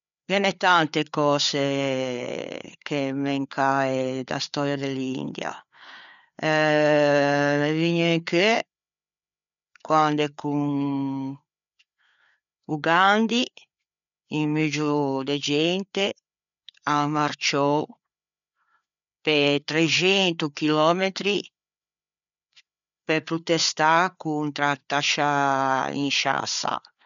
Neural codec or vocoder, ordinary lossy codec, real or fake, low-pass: codec, 16 kHz, 4 kbps, FreqCodec, larger model; none; fake; 7.2 kHz